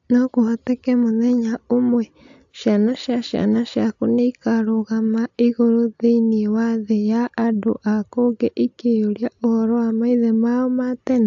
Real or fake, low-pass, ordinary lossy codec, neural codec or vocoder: real; 7.2 kHz; AAC, 64 kbps; none